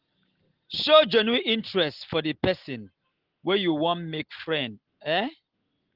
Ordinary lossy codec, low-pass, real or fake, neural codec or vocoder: Opus, 16 kbps; 5.4 kHz; real; none